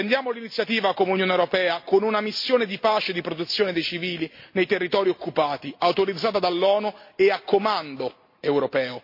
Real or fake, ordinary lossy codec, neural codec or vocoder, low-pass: real; MP3, 32 kbps; none; 5.4 kHz